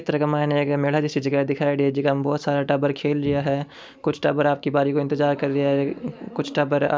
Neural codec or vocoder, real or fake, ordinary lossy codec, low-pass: none; real; none; none